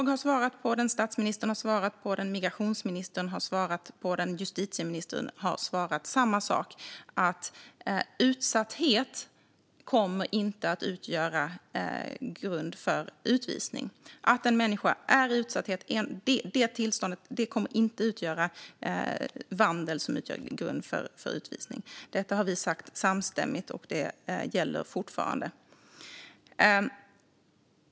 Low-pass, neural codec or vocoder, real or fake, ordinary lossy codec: none; none; real; none